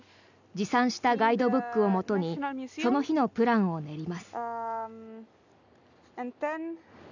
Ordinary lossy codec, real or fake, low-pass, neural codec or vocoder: none; real; 7.2 kHz; none